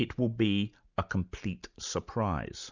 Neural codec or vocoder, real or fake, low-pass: none; real; 7.2 kHz